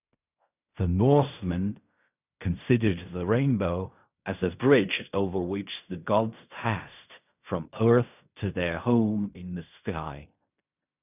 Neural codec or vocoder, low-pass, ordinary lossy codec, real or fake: codec, 16 kHz in and 24 kHz out, 0.4 kbps, LongCat-Audio-Codec, fine tuned four codebook decoder; 3.6 kHz; none; fake